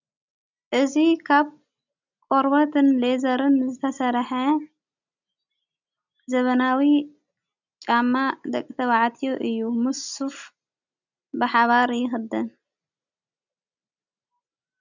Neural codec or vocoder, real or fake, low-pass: none; real; 7.2 kHz